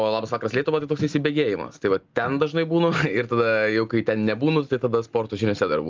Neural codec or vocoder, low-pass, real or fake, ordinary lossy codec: none; 7.2 kHz; real; Opus, 32 kbps